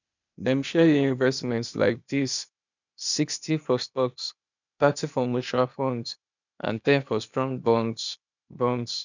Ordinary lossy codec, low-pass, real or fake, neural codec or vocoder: none; 7.2 kHz; fake; codec, 16 kHz, 0.8 kbps, ZipCodec